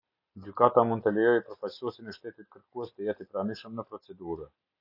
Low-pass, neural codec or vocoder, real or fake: 5.4 kHz; none; real